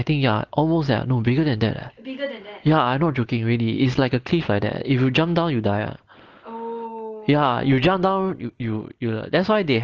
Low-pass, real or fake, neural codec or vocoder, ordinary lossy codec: 7.2 kHz; real; none; Opus, 16 kbps